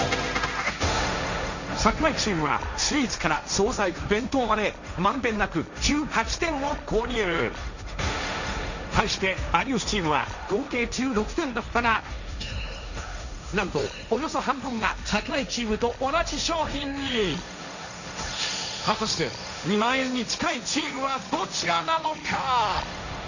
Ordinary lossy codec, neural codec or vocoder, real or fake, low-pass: none; codec, 16 kHz, 1.1 kbps, Voila-Tokenizer; fake; 7.2 kHz